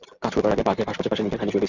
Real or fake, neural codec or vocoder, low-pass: real; none; 7.2 kHz